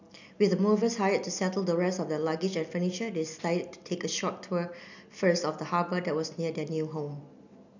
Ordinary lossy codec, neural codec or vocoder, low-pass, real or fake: none; none; 7.2 kHz; real